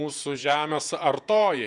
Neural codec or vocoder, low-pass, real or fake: vocoder, 48 kHz, 128 mel bands, Vocos; 10.8 kHz; fake